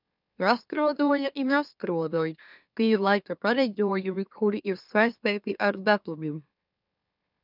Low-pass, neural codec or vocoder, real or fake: 5.4 kHz; autoencoder, 44.1 kHz, a latent of 192 numbers a frame, MeloTTS; fake